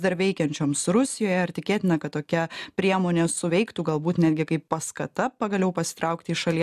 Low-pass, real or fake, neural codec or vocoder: 14.4 kHz; real; none